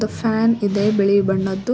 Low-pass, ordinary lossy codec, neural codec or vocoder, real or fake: none; none; none; real